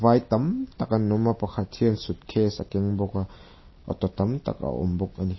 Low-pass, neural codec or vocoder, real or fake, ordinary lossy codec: 7.2 kHz; none; real; MP3, 24 kbps